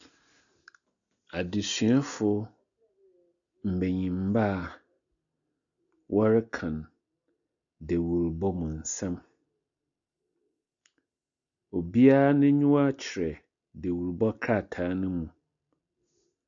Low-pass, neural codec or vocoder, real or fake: 7.2 kHz; none; real